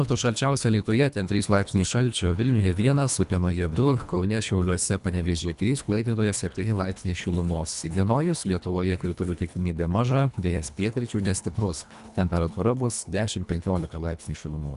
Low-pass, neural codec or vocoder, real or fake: 10.8 kHz; codec, 24 kHz, 1.5 kbps, HILCodec; fake